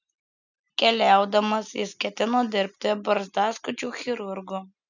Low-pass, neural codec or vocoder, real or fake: 7.2 kHz; none; real